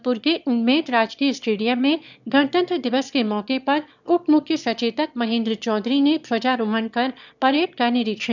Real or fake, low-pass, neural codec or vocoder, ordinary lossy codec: fake; 7.2 kHz; autoencoder, 22.05 kHz, a latent of 192 numbers a frame, VITS, trained on one speaker; none